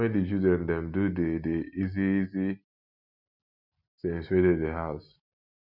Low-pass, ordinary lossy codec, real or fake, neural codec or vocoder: 5.4 kHz; MP3, 48 kbps; real; none